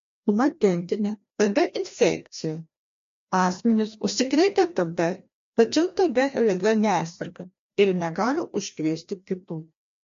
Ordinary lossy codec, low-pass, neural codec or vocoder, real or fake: MP3, 48 kbps; 7.2 kHz; codec, 16 kHz, 1 kbps, FreqCodec, larger model; fake